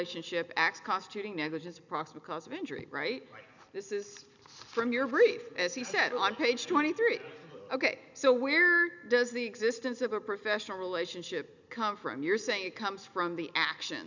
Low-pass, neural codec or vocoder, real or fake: 7.2 kHz; none; real